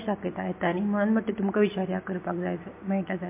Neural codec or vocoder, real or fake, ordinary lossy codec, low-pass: none; real; MP3, 24 kbps; 3.6 kHz